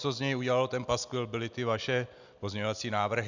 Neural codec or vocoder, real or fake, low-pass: none; real; 7.2 kHz